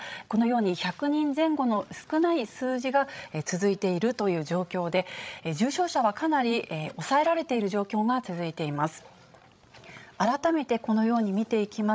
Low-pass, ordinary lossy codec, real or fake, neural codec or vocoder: none; none; fake; codec, 16 kHz, 16 kbps, FreqCodec, larger model